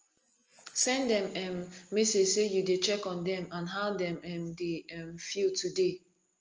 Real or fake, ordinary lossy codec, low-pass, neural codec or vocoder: real; Opus, 24 kbps; 7.2 kHz; none